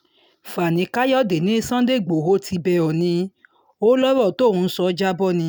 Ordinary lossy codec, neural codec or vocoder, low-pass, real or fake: none; none; none; real